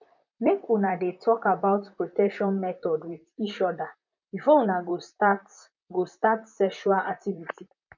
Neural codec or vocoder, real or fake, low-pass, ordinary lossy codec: vocoder, 44.1 kHz, 128 mel bands, Pupu-Vocoder; fake; 7.2 kHz; none